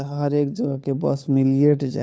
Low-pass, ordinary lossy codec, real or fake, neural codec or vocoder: none; none; fake; codec, 16 kHz, 4 kbps, FunCodec, trained on LibriTTS, 50 frames a second